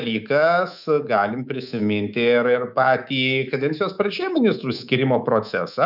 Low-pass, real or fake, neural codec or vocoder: 5.4 kHz; fake; codec, 24 kHz, 3.1 kbps, DualCodec